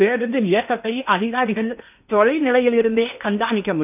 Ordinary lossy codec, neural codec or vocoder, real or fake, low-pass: none; codec, 16 kHz in and 24 kHz out, 0.8 kbps, FocalCodec, streaming, 65536 codes; fake; 3.6 kHz